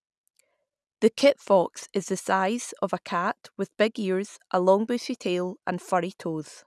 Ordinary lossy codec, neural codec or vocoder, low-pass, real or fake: none; none; none; real